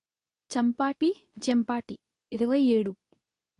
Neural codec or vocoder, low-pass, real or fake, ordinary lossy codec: codec, 24 kHz, 0.9 kbps, WavTokenizer, medium speech release version 2; 10.8 kHz; fake; AAC, 48 kbps